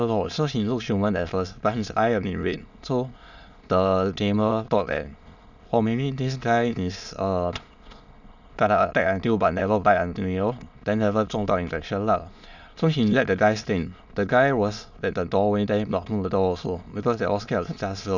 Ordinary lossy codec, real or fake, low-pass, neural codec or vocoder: none; fake; 7.2 kHz; autoencoder, 22.05 kHz, a latent of 192 numbers a frame, VITS, trained on many speakers